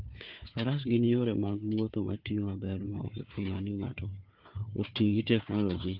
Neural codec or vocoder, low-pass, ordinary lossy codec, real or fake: codec, 16 kHz, 4 kbps, FunCodec, trained on Chinese and English, 50 frames a second; 5.4 kHz; Opus, 24 kbps; fake